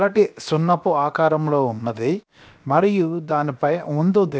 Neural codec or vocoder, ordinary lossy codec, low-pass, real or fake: codec, 16 kHz, 0.7 kbps, FocalCodec; none; none; fake